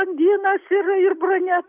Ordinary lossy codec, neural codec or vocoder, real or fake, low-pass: Opus, 64 kbps; none; real; 3.6 kHz